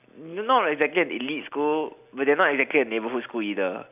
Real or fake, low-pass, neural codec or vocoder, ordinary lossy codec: real; 3.6 kHz; none; none